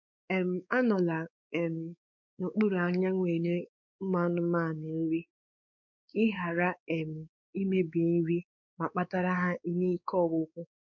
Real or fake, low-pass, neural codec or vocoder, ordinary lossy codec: fake; 7.2 kHz; codec, 16 kHz, 4 kbps, X-Codec, WavLM features, trained on Multilingual LibriSpeech; none